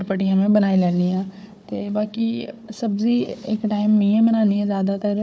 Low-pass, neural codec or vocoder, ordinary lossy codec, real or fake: none; codec, 16 kHz, 4 kbps, FunCodec, trained on Chinese and English, 50 frames a second; none; fake